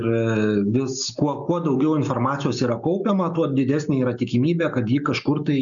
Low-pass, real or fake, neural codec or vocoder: 7.2 kHz; real; none